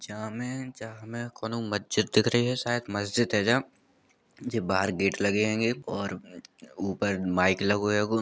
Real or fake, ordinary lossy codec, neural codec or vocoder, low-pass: real; none; none; none